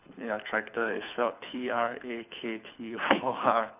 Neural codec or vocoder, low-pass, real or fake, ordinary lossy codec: codec, 24 kHz, 6 kbps, HILCodec; 3.6 kHz; fake; none